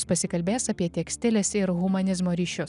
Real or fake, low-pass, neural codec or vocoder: real; 10.8 kHz; none